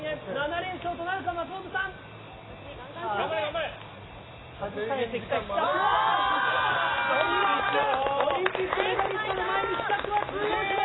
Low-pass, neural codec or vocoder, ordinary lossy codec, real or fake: 7.2 kHz; none; AAC, 16 kbps; real